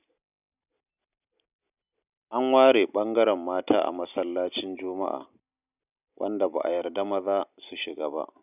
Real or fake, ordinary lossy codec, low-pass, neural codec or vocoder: real; none; 3.6 kHz; none